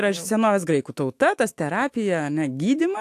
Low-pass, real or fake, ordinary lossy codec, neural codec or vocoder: 14.4 kHz; fake; AAC, 64 kbps; autoencoder, 48 kHz, 128 numbers a frame, DAC-VAE, trained on Japanese speech